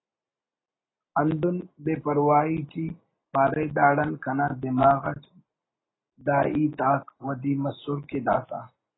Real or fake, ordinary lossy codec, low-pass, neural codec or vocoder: real; AAC, 16 kbps; 7.2 kHz; none